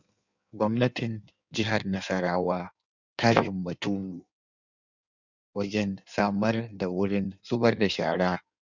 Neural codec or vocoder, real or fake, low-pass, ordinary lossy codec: codec, 16 kHz in and 24 kHz out, 1.1 kbps, FireRedTTS-2 codec; fake; 7.2 kHz; none